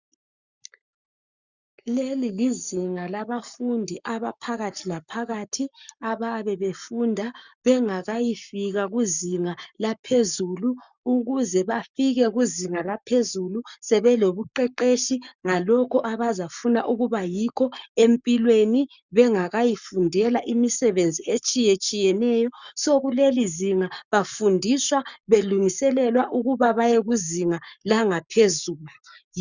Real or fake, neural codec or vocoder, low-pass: fake; codec, 44.1 kHz, 7.8 kbps, Pupu-Codec; 7.2 kHz